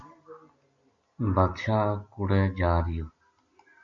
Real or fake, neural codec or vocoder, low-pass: real; none; 7.2 kHz